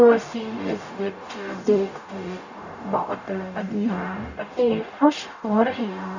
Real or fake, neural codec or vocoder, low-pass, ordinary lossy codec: fake; codec, 44.1 kHz, 0.9 kbps, DAC; 7.2 kHz; none